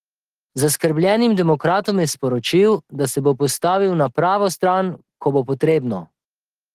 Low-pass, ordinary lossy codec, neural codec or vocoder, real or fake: 14.4 kHz; Opus, 16 kbps; none; real